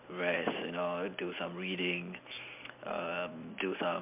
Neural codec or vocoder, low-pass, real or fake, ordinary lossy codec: none; 3.6 kHz; real; MP3, 32 kbps